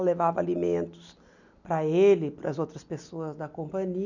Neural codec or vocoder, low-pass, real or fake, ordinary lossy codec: none; 7.2 kHz; real; none